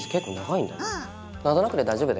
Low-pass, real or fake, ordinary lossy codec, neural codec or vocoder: none; real; none; none